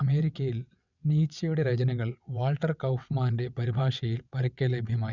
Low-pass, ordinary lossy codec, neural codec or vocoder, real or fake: 7.2 kHz; none; vocoder, 22.05 kHz, 80 mel bands, WaveNeXt; fake